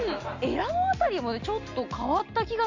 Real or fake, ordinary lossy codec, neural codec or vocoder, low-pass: real; MP3, 48 kbps; none; 7.2 kHz